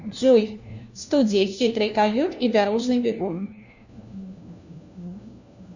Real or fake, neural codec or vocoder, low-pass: fake; codec, 16 kHz, 1 kbps, FunCodec, trained on LibriTTS, 50 frames a second; 7.2 kHz